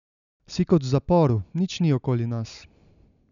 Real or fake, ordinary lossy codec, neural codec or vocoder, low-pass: real; none; none; 7.2 kHz